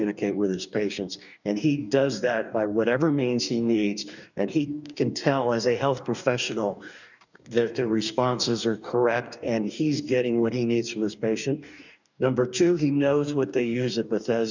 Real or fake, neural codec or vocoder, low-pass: fake; codec, 44.1 kHz, 2.6 kbps, DAC; 7.2 kHz